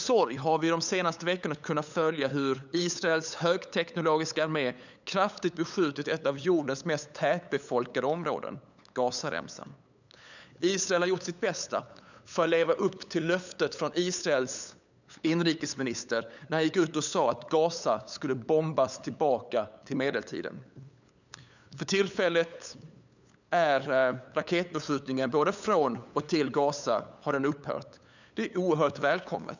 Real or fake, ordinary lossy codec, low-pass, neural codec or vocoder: fake; none; 7.2 kHz; codec, 16 kHz, 8 kbps, FunCodec, trained on LibriTTS, 25 frames a second